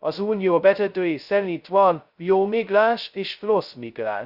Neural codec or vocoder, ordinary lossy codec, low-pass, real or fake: codec, 16 kHz, 0.2 kbps, FocalCodec; Opus, 64 kbps; 5.4 kHz; fake